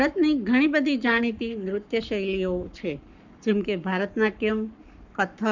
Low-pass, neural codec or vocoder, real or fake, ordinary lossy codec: 7.2 kHz; codec, 44.1 kHz, 7.8 kbps, Pupu-Codec; fake; none